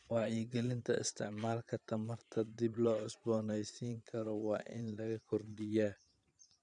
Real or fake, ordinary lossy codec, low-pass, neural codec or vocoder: fake; none; 9.9 kHz; vocoder, 22.05 kHz, 80 mel bands, Vocos